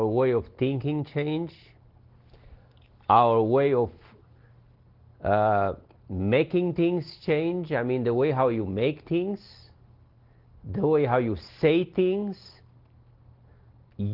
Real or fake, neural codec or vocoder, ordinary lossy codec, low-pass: real; none; Opus, 16 kbps; 5.4 kHz